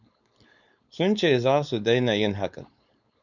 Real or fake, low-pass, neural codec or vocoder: fake; 7.2 kHz; codec, 16 kHz, 4.8 kbps, FACodec